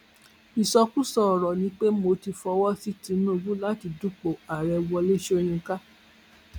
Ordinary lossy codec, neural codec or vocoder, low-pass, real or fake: none; none; 19.8 kHz; real